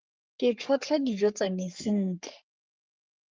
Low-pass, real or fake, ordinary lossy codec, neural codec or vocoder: 7.2 kHz; fake; Opus, 24 kbps; codec, 44.1 kHz, 3.4 kbps, Pupu-Codec